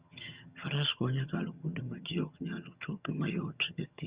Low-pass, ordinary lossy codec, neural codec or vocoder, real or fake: 3.6 kHz; Opus, 64 kbps; vocoder, 22.05 kHz, 80 mel bands, HiFi-GAN; fake